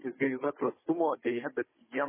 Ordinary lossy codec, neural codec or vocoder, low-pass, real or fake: MP3, 16 kbps; codec, 16 kHz, 16 kbps, FunCodec, trained on Chinese and English, 50 frames a second; 3.6 kHz; fake